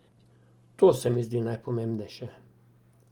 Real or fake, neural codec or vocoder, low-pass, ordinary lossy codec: real; none; 19.8 kHz; Opus, 24 kbps